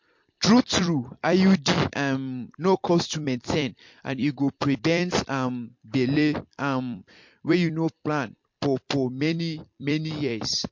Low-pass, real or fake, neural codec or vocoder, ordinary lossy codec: 7.2 kHz; fake; vocoder, 44.1 kHz, 128 mel bands every 256 samples, BigVGAN v2; MP3, 48 kbps